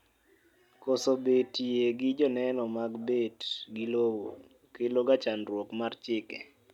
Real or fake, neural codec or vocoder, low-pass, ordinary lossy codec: real; none; 19.8 kHz; none